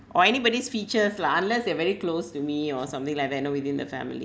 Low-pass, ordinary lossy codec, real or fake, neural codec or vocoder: none; none; real; none